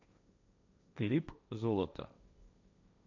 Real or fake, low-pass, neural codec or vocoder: fake; 7.2 kHz; codec, 16 kHz, 1.1 kbps, Voila-Tokenizer